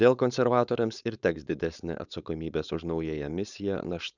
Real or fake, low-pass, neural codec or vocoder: fake; 7.2 kHz; codec, 16 kHz, 4.8 kbps, FACodec